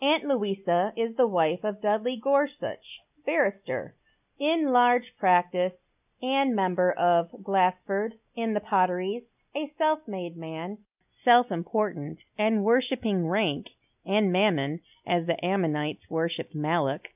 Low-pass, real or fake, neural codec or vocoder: 3.6 kHz; real; none